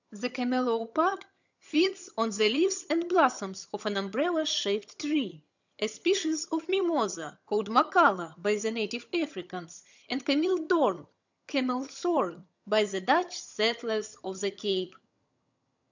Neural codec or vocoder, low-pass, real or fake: vocoder, 22.05 kHz, 80 mel bands, HiFi-GAN; 7.2 kHz; fake